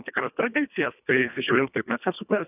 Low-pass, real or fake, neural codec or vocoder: 3.6 kHz; fake; codec, 24 kHz, 1.5 kbps, HILCodec